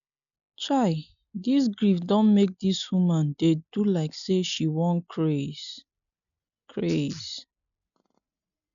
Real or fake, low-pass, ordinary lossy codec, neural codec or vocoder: real; 7.2 kHz; none; none